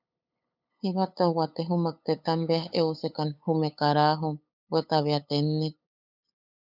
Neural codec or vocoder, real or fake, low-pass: codec, 16 kHz, 8 kbps, FunCodec, trained on LibriTTS, 25 frames a second; fake; 5.4 kHz